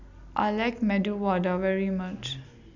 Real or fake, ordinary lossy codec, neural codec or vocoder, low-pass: real; none; none; 7.2 kHz